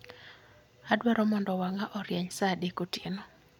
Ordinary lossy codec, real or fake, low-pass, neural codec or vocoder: none; real; 19.8 kHz; none